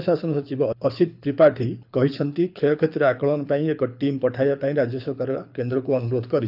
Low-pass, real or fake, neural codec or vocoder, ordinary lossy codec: 5.4 kHz; fake; codec, 24 kHz, 6 kbps, HILCodec; none